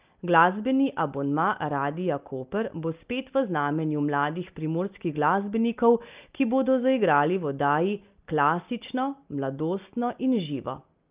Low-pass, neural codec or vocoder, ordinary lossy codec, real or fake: 3.6 kHz; none; Opus, 32 kbps; real